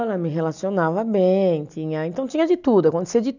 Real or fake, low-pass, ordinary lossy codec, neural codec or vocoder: real; 7.2 kHz; none; none